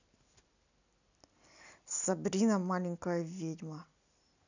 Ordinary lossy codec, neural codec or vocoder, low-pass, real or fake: none; none; 7.2 kHz; real